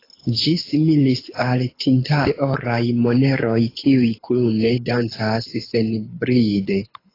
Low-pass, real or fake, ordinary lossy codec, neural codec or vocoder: 5.4 kHz; fake; AAC, 24 kbps; codec, 24 kHz, 6 kbps, HILCodec